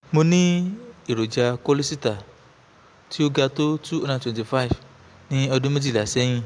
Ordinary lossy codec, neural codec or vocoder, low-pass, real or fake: AAC, 64 kbps; none; 9.9 kHz; real